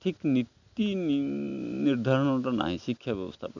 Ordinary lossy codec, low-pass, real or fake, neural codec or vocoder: none; 7.2 kHz; real; none